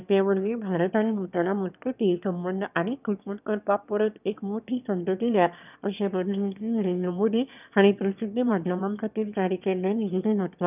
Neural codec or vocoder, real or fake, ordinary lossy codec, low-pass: autoencoder, 22.05 kHz, a latent of 192 numbers a frame, VITS, trained on one speaker; fake; none; 3.6 kHz